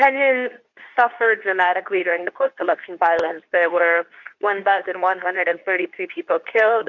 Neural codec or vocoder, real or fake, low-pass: codec, 24 kHz, 0.9 kbps, WavTokenizer, medium speech release version 2; fake; 7.2 kHz